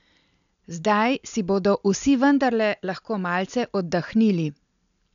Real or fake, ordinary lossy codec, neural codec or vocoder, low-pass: real; MP3, 96 kbps; none; 7.2 kHz